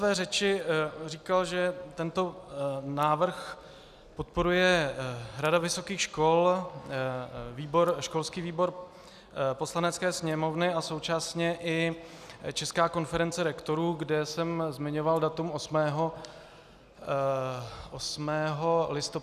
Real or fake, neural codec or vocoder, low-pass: real; none; 14.4 kHz